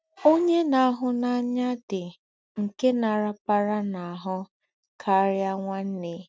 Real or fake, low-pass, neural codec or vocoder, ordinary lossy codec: real; none; none; none